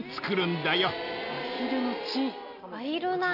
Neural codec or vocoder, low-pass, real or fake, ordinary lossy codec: none; 5.4 kHz; real; none